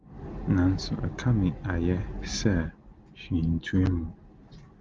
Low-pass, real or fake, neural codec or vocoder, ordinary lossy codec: 7.2 kHz; real; none; Opus, 32 kbps